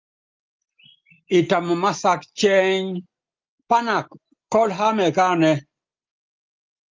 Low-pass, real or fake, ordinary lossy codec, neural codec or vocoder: 7.2 kHz; real; Opus, 24 kbps; none